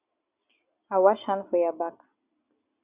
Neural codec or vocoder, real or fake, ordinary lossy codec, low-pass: none; real; Opus, 64 kbps; 3.6 kHz